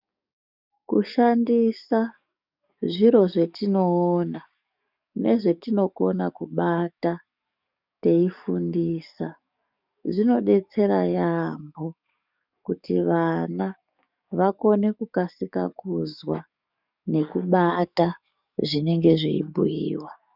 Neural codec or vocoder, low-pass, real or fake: codec, 44.1 kHz, 7.8 kbps, DAC; 5.4 kHz; fake